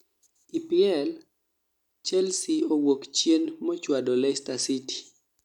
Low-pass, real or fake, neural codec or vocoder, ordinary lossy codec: 19.8 kHz; real; none; none